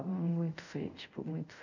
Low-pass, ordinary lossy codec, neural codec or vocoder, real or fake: 7.2 kHz; none; codec, 24 kHz, 0.5 kbps, DualCodec; fake